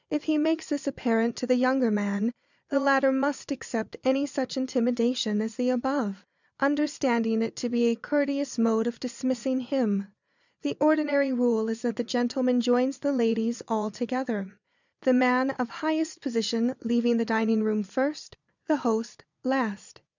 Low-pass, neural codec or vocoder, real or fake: 7.2 kHz; vocoder, 22.05 kHz, 80 mel bands, Vocos; fake